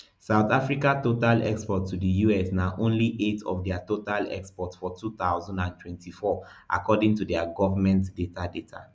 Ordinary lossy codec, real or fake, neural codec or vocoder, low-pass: none; real; none; none